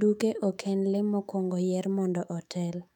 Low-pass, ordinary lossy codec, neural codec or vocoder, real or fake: 19.8 kHz; none; autoencoder, 48 kHz, 128 numbers a frame, DAC-VAE, trained on Japanese speech; fake